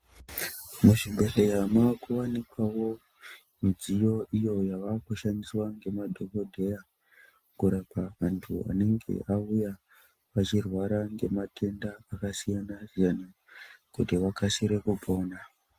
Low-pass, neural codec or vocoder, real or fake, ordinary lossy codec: 14.4 kHz; none; real; Opus, 24 kbps